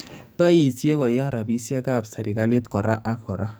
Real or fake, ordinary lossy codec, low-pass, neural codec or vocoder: fake; none; none; codec, 44.1 kHz, 2.6 kbps, SNAC